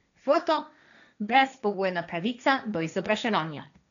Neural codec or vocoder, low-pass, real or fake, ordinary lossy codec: codec, 16 kHz, 1.1 kbps, Voila-Tokenizer; 7.2 kHz; fake; none